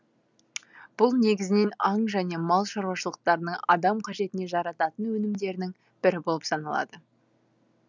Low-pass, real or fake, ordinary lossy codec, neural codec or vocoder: 7.2 kHz; real; none; none